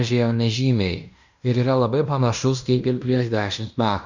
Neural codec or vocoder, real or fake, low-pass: codec, 16 kHz in and 24 kHz out, 0.9 kbps, LongCat-Audio-Codec, fine tuned four codebook decoder; fake; 7.2 kHz